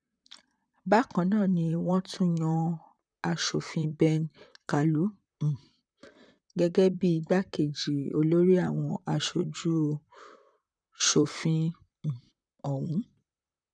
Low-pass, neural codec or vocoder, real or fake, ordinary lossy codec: 9.9 kHz; vocoder, 44.1 kHz, 128 mel bands, Pupu-Vocoder; fake; none